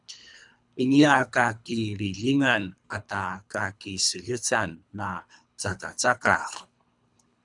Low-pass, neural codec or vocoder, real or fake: 10.8 kHz; codec, 24 kHz, 3 kbps, HILCodec; fake